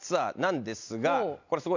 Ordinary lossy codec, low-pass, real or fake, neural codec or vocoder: MP3, 64 kbps; 7.2 kHz; real; none